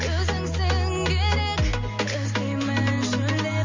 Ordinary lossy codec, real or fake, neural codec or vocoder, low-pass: MP3, 48 kbps; real; none; 7.2 kHz